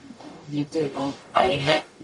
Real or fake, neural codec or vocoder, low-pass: fake; codec, 44.1 kHz, 0.9 kbps, DAC; 10.8 kHz